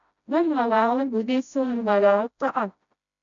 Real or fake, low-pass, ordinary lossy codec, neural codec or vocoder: fake; 7.2 kHz; MP3, 64 kbps; codec, 16 kHz, 0.5 kbps, FreqCodec, smaller model